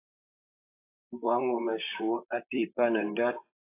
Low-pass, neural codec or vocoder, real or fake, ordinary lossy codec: 3.6 kHz; vocoder, 44.1 kHz, 128 mel bands, Pupu-Vocoder; fake; AAC, 24 kbps